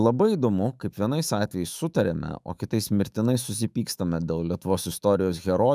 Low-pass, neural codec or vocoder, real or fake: 14.4 kHz; autoencoder, 48 kHz, 128 numbers a frame, DAC-VAE, trained on Japanese speech; fake